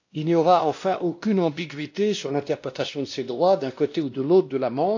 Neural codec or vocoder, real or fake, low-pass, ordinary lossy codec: codec, 16 kHz, 1 kbps, X-Codec, WavLM features, trained on Multilingual LibriSpeech; fake; 7.2 kHz; AAC, 48 kbps